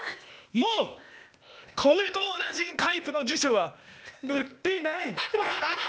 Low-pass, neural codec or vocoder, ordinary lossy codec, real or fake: none; codec, 16 kHz, 0.8 kbps, ZipCodec; none; fake